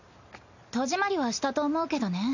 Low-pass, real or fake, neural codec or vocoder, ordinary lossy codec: 7.2 kHz; real; none; none